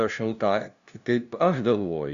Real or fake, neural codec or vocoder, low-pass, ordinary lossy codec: fake; codec, 16 kHz, 0.5 kbps, FunCodec, trained on LibriTTS, 25 frames a second; 7.2 kHz; Opus, 64 kbps